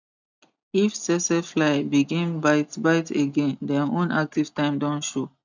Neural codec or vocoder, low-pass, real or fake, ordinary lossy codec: none; 7.2 kHz; real; none